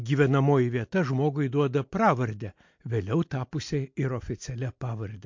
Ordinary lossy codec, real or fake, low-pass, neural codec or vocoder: MP3, 48 kbps; real; 7.2 kHz; none